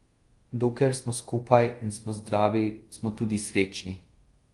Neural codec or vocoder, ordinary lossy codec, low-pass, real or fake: codec, 24 kHz, 0.5 kbps, DualCodec; Opus, 24 kbps; 10.8 kHz; fake